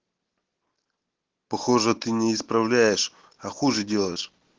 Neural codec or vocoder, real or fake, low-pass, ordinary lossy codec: none; real; 7.2 kHz; Opus, 16 kbps